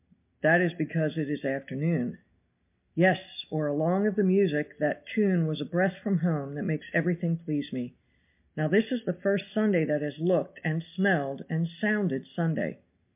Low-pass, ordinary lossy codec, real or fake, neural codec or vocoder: 3.6 kHz; MP3, 32 kbps; real; none